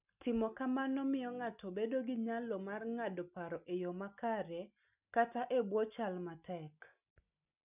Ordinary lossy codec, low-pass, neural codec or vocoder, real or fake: none; 3.6 kHz; none; real